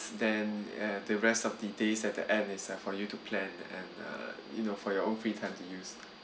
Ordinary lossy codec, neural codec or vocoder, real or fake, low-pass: none; none; real; none